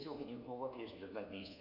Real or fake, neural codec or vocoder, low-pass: fake; codec, 24 kHz, 1.2 kbps, DualCodec; 5.4 kHz